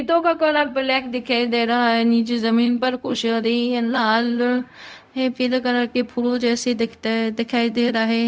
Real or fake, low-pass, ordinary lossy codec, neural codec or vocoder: fake; none; none; codec, 16 kHz, 0.4 kbps, LongCat-Audio-Codec